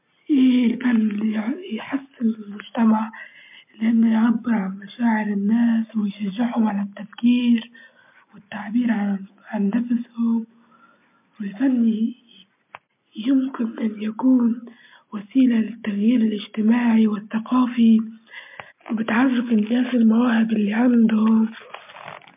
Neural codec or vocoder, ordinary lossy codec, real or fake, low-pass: none; MP3, 32 kbps; real; 3.6 kHz